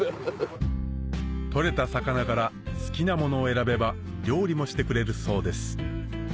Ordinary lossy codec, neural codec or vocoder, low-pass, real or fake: none; none; none; real